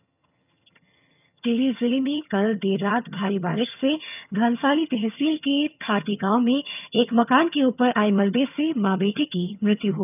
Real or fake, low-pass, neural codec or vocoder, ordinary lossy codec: fake; 3.6 kHz; vocoder, 22.05 kHz, 80 mel bands, HiFi-GAN; none